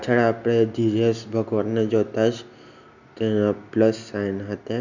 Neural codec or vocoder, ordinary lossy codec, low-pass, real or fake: none; none; 7.2 kHz; real